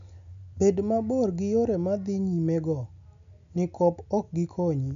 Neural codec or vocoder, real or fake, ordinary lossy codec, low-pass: none; real; none; 7.2 kHz